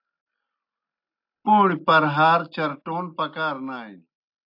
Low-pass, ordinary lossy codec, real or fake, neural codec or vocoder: 5.4 kHz; AAC, 48 kbps; real; none